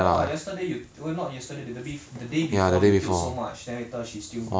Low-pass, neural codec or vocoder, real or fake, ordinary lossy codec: none; none; real; none